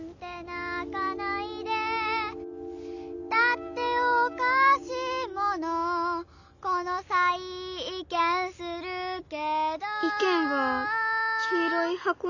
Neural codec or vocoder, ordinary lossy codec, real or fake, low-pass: none; none; real; 7.2 kHz